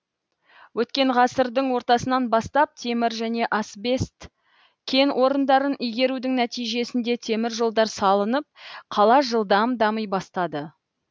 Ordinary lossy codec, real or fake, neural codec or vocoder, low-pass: none; real; none; none